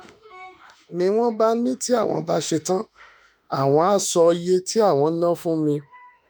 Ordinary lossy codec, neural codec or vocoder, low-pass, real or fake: none; autoencoder, 48 kHz, 32 numbers a frame, DAC-VAE, trained on Japanese speech; none; fake